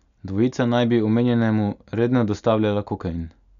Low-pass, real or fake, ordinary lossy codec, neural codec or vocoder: 7.2 kHz; real; none; none